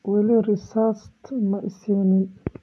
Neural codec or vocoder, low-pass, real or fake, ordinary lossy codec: none; none; real; none